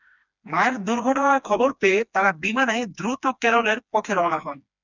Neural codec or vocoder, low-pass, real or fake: codec, 16 kHz, 2 kbps, FreqCodec, smaller model; 7.2 kHz; fake